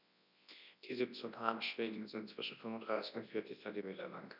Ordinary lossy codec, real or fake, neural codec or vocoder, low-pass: none; fake; codec, 24 kHz, 0.9 kbps, WavTokenizer, large speech release; 5.4 kHz